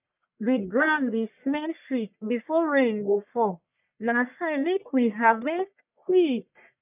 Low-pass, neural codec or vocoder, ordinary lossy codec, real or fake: 3.6 kHz; codec, 44.1 kHz, 1.7 kbps, Pupu-Codec; none; fake